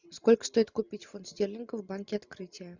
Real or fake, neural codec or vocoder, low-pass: real; none; 7.2 kHz